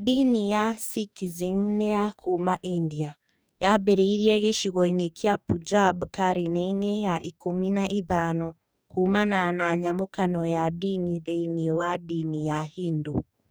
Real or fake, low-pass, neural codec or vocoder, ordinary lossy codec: fake; none; codec, 44.1 kHz, 2.6 kbps, DAC; none